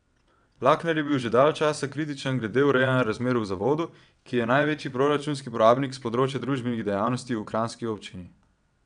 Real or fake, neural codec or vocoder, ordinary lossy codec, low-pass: fake; vocoder, 22.05 kHz, 80 mel bands, WaveNeXt; none; 9.9 kHz